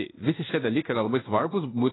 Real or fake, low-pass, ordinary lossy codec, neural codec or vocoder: fake; 7.2 kHz; AAC, 16 kbps; codec, 16 kHz in and 24 kHz out, 1 kbps, XY-Tokenizer